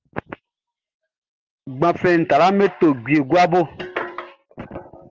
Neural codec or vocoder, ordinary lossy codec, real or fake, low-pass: none; Opus, 16 kbps; real; 7.2 kHz